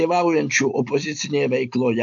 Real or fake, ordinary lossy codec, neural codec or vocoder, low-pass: real; AAC, 64 kbps; none; 7.2 kHz